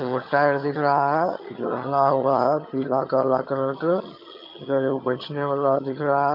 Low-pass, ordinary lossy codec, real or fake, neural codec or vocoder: 5.4 kHz; MP3, 48 kbps; fake; vocoder, 22.05 kHz, 80 mel bands, HiFi-GAN